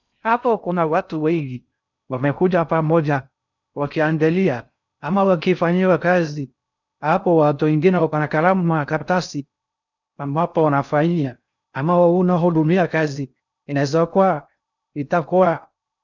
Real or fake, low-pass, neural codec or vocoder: fake; 7.2 kHz; codec, 16 kHz in and 24 kHz out, 0.6 kbps, FocalCodec, streaming, 4096 codes